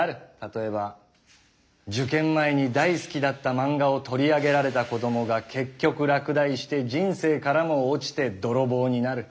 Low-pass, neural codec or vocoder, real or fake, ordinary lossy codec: none; none; real; none